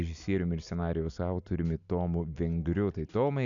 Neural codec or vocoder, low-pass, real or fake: none; 7.2 kHz; real